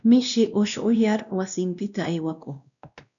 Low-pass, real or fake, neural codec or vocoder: 7.2 kHz; fake; codec, 16 kHz, 1 kbps, X-Codec, WavLM features, trained on Multilingual LibriSpeech